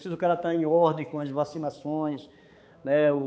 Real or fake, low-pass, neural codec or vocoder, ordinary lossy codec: fake; none; codec, 16 kHz, 4 kbps, X-Codec, HuBERT features, trained on balanced general audio; none